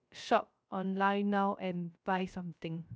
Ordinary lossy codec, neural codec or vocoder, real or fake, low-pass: none; codec, 16 kHz, 0.3 kbps, FocalCodec; fake; none